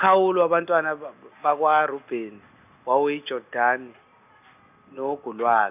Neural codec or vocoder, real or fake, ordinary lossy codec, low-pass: none; real; none; 3.6 kHz